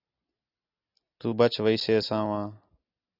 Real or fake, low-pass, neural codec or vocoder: real; 5.4 kHz; none